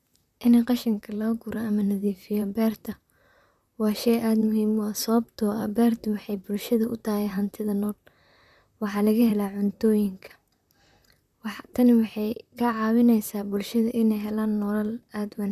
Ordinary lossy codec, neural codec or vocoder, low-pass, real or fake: none; vocoder, 44.1 kHz, 128 mel bands, Pupu-Vocoder; 14.4 kHz; fake